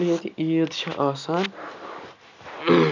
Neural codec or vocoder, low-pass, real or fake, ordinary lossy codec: none; 7.2 kHz; real; none